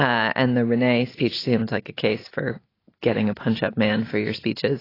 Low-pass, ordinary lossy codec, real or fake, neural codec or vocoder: 5.4 kHz; AAC, 24 kbps; real; none